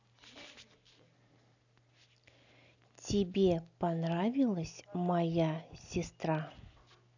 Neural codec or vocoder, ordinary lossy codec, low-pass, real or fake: none; none; 7.2 kHz; real